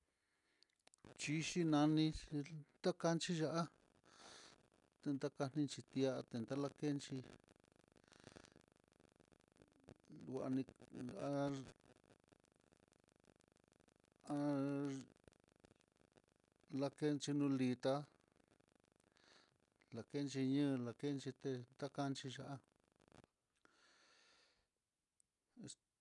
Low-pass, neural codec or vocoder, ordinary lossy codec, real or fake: 10.8 kHz; none; none; real